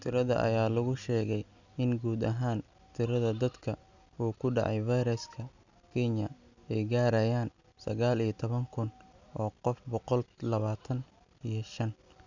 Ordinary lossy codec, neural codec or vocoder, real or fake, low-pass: none; none; real; 7.2 kHz